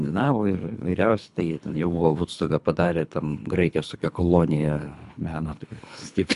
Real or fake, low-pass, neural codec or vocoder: fake; 10.8 kHz; codec, 24 kHz, 3 kbps, HILCodec